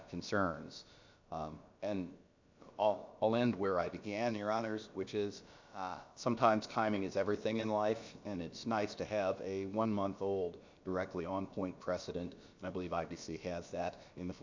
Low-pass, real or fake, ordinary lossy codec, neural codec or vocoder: 7.2 kHz; fake; MP3, 64 kbps; codec, 16 kHz, about 1 kbps, DyCAST, with the encoder's durations